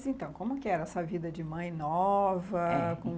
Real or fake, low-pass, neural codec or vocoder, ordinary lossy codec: real; none; none; none